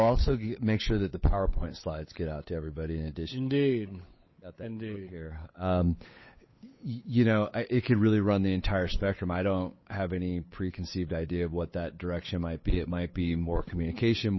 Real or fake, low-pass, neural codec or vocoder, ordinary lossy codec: fake; 7.2 kHz; codec, 16 kHz, 8 kbps, FunCodec, trained on Chinese and English, 25 frames a second; MP3, 24 kbps